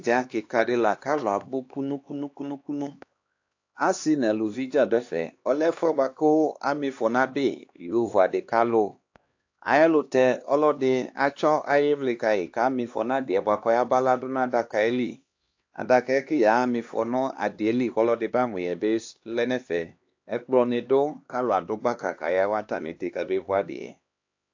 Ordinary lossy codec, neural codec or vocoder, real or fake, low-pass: AAC, 48 kbps; codec, 16 kHz, 2 kbps, X-Codec, HuBERT features, trained on LibriSpeech; fake; 7.2 kHz